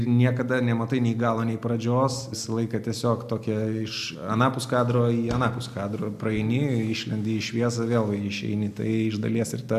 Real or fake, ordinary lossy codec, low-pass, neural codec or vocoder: real; MP3, 96 kbps; 14.4 kHz; none